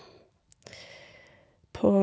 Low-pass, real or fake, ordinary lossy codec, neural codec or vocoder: none; real; none; none